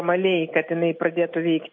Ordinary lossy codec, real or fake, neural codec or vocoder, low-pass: MP3, 32 kbps; real; none; 7.2 kHz